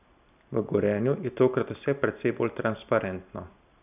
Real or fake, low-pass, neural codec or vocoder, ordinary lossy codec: real; 3.6 kHz; none; none